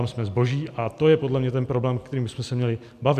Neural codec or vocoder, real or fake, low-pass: none; real; 14.4 kHz